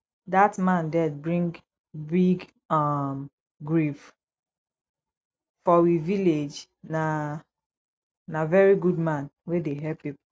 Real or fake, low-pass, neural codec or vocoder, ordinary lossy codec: real; none; none; none